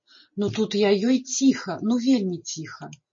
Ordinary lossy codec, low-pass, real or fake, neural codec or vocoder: MP3, 32 kbps; 7.2 kHz; real; none